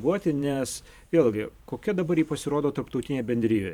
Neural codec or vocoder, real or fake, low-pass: vocoder, 44.1 kHz, 128 mel bands every 512 samples, BigVGAN v2; fake; 19.8 kHz